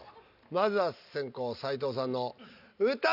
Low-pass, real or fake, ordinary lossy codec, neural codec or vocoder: 5.4 kHz; real; none; none